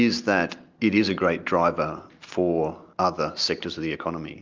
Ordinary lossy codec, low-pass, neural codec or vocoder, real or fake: Opus, 32 kbps; 7.2 kHz; none; real